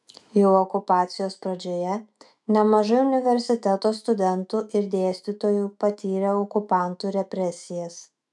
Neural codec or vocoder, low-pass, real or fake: autoencoder, 48 kHz, 128 numbers a frame, DAC-VAE, trained on Japanese speech; 10.8 kHz; fake